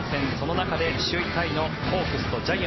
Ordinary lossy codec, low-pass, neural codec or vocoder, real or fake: MP3, 24 kbps; 7.2 kHz; none; real